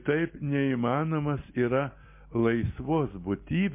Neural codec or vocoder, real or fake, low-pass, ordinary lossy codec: none; real; 3.6 kHz; MP3, 24 kbps